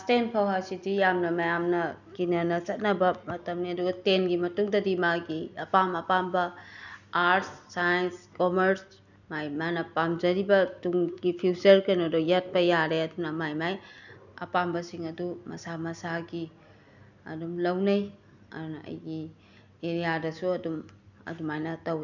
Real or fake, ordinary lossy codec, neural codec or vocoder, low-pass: real; none; none; 7.2 kHz